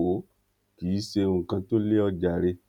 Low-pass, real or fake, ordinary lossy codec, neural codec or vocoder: 19.8 kHz; real; none; none